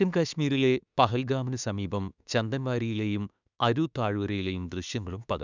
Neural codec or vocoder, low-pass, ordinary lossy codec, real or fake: autoencoder, 48 kHz, 32 numbers a frame, DAC-VAE, trained on Japanese speech; 7.2 kHz; none; fake